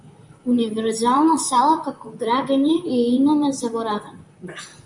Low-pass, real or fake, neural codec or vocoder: 10.8 kHz; fake; vocoder, 44.1 kHz, 128 mel bands, Pupu-Vocoder